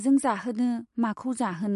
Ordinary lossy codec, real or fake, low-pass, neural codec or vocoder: MP3, 48 kbps; real; 10.8 kHz; none